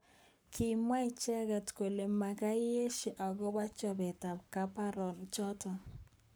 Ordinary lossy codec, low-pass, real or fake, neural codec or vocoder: none; none; fake; codec, 44.1 kHz, 7.8 kbps, Pupu-Codec